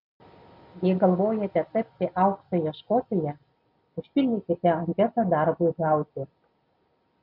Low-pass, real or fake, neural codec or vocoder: 5.4 kHz; real; none